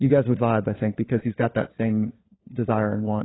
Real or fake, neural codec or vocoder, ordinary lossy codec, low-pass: fake; codec, 16 kHz, 4 kbps, FunCodec, trained on LibriTTS, 50 frames a second; AAC, 16 kbps; 7.2 kHz